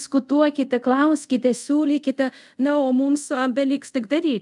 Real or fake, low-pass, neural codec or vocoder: fake; 10.8 kHz; codec, 24 kHz, 0.5 kbps, DualCodec